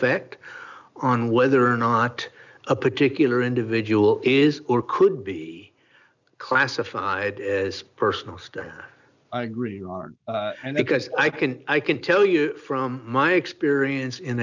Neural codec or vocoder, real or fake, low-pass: none; real; 7.2 kHz